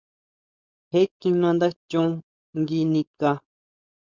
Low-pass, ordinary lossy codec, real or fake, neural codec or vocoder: 7.2 kHz; Opus, 64 kbps; fake; codec, 16 kHz, 4.8 kbps, FACodec